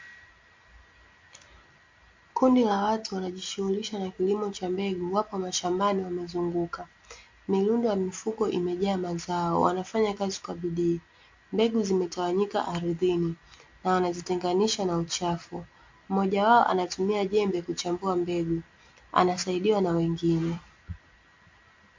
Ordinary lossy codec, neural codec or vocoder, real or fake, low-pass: MP3, 48 kbps; none; real; 7.2 kHz